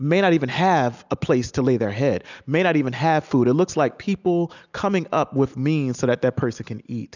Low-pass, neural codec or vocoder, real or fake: 7.2 kHz; none; real